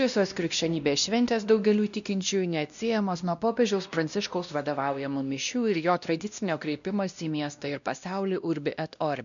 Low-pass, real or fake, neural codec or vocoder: 7.2 kHz; fake; codec, 16 kHz, 1 kbps, X-Codec, WavLM features, trained on Multilingual LibriSpeech